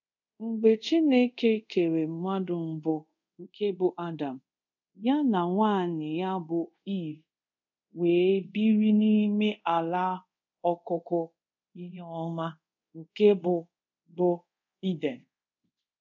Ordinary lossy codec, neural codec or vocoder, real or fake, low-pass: none; codec, 24 kHz, 0.5 kbps, DualCodec; fake; 7.2 kHz